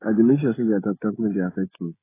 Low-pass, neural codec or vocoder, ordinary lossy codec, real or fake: 3.6 kHz; codec, 16 kHz, 16 kbps, FreqCodec, smaller model; AAC, 16 kbps; fake